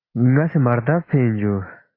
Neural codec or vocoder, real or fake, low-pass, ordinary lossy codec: none; real; 5.4 kHz; AAC, 24 kbps